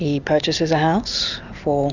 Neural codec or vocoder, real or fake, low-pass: none; real; 7.2 kHz